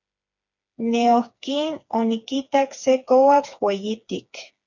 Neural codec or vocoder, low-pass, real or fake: codec, 16 kHz, 4 kbps, FreqCodec, smaller model; 7.2 kHz; fake